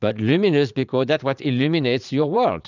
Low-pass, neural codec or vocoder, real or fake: 7.2 kHz; vocoder, 22.05 kHz, 80 mel bands, Vocos; fake